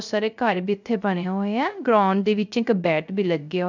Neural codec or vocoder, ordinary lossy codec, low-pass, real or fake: codec, 16 kHz, 0.3 kbps, FocalCodec; none; 7.2 kHz; fake